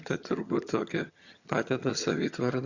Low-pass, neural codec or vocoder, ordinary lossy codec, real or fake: 7.2 kHz; vocoder, 22.05 kHz, 80 mel bands, HiFi-GAN; Opus, 64 kbps; fake